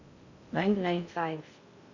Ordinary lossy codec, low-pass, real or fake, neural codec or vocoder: none; 7.2 kHz; fake; codec, 16 kHz in and 24 kHz out, 0.6 kbps, FocalCodec, streaming, 2048 codes